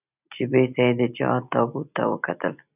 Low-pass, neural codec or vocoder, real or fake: 3.6 kHz; none; real